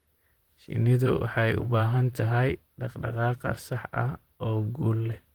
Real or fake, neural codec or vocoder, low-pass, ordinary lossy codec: fake; vocoder, 44.1 kHz, 128 mel bands, Pupu-Vocoder; 19.8 kHz; Opus, 24 kbps